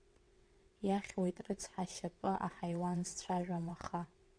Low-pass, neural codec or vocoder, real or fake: 9.9 kHz; vocoder, 22.05 kHz, 80 mel bands, WaveNeXt; fake